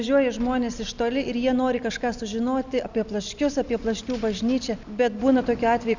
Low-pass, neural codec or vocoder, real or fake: 7.2 kHz; none; real